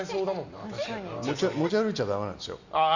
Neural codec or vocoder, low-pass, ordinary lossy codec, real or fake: none; 7.2 kHz; Opus, 64 kbps; real